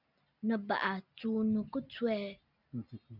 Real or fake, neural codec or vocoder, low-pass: real; none; 5.4 kHz